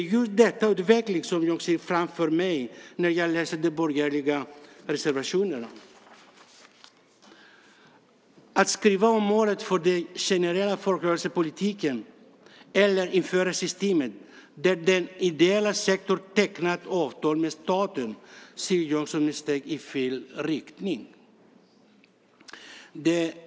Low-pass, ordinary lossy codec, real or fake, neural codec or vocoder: none; none; real; none